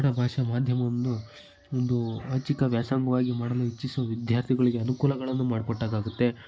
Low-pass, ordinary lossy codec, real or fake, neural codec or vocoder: none; none; real; none